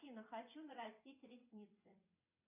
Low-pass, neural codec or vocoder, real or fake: 3.6 kHz; vocoder, 22.05 kHz, 80 mel bands, WaveNeXt; fake